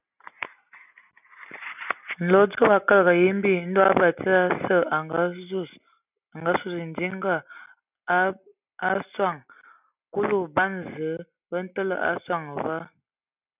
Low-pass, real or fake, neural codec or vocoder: 3.6 kHz; real; none